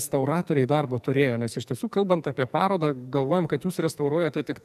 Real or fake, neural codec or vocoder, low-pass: fake; codec, 44.1 kHz, 2.6 kbps, SNAC; 14.4 kHz